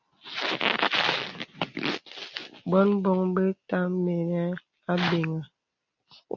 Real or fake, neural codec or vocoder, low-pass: real; none; 7.2 kHz